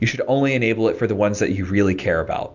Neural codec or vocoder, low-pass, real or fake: none; 7.2 kHz; real